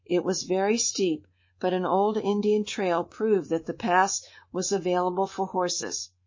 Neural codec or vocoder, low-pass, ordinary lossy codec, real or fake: codec, 24 kHz, 3.1 kbps, DualCodec; 7.2 kHz; MP3, 32 kbps; fake